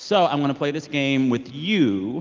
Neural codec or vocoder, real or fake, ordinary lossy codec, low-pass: none; real; Opus, 32 kbps; 7.2 kHz